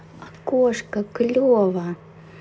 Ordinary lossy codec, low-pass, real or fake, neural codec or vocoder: none; none; real; none